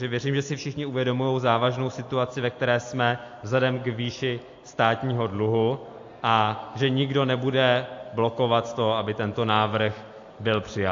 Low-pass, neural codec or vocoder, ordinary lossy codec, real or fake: 7.2 kHz; none; AAC, 48 kbps; real